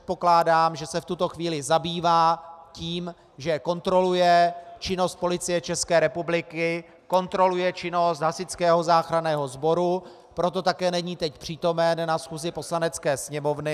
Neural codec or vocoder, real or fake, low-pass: none; real; 14.4 kHz